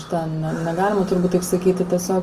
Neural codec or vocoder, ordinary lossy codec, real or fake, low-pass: none; Opus, 24 kbps; real; 14.4 kHz